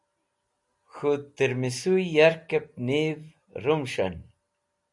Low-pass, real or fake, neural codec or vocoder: 10.8 kHz; real; none